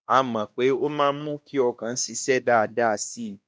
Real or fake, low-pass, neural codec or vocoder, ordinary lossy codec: fake; none; codec, 16 kHz, 1 kbps, X-Codec, WavLM features, trained on Multilingual LibriSpeech; none